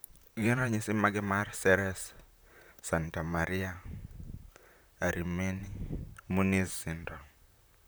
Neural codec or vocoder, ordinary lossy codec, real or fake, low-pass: vocoder, 44.1 kHz, 128 mel bands, Pupu-Vocoder; none; fake; none